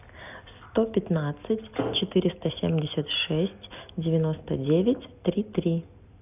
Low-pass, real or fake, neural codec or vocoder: 3.6 kHz; real; none